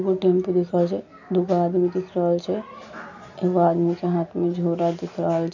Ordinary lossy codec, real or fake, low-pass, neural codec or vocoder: none; real; 7.2 kHz; none